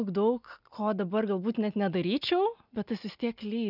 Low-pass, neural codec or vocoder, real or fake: 5.4 kHz; none; real